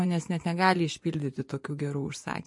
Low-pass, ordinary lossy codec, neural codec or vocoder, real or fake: 10.8 kHz; MP3, 48 kbps; vocoder, 24 kHz, 100 mel bands, Vocos; fake